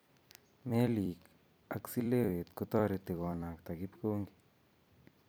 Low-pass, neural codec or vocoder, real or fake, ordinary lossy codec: none; vocoder, 44.1 kHz, 128 mel bands every 256 samples, BigVGAN v2; fake; none